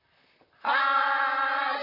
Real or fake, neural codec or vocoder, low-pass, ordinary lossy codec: fake; vocoder, 44.1 kHz, 128 mel bands, Pupu-Vocoder; 5.4 kHz; none